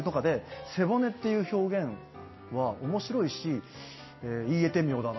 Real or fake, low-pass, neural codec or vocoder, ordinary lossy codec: real; 7.2 kHz; none; MP3, 24 kbps